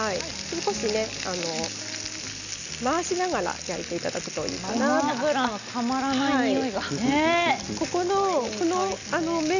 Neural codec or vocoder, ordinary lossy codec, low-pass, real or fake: none; none; 7.2 kHz; real